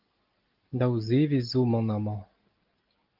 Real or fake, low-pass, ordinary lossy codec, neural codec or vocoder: real; 5.4 kHz; Opus, 32 kbps; none